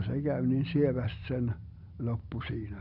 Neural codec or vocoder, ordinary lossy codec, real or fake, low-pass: vocoder, 44.1 kHz, 128 mel bands every 256 samples, BigVGAN v2; none; fake; 5.4 kHz